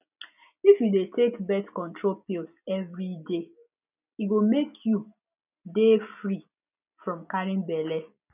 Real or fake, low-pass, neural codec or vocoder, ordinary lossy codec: real; 3.6 kHz; none; none